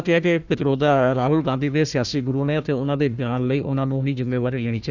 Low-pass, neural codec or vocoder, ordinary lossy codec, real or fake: 7.2 kHz; codec, 16 kHz, 1 kbps, FunCodec, trained on Chinese and English, 50 frames a second; none; fake